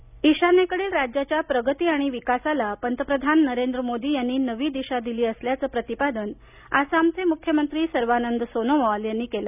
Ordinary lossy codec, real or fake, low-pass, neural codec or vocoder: none; real; 3.6 kHz; none